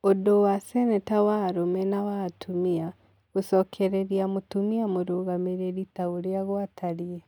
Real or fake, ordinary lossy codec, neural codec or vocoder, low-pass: real; none; none; 19.8 kHz